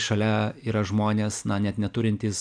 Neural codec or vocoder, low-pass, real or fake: none; 9.9 kHz; real